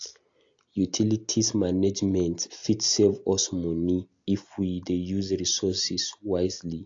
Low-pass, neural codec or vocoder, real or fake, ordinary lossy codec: 7.2 kHz; none; real; AAC, 48 kbps